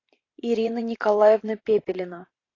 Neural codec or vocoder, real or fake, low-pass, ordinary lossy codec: vocoder, 44.1 kHz, 128 mel bands every 512 samples, BigVGAN v2; fake; 7.2 kHz; AAC, 32 kbps